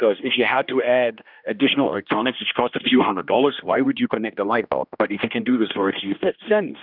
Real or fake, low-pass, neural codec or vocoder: fake; 5.4 kHz; codec, 16 kHz, 1 kbps, X-Codec, HuBERT features, trained on general audio